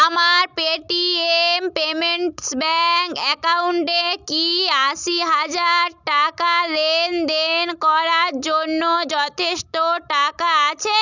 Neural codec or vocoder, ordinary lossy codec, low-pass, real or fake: none; none; 7.2 kHz; real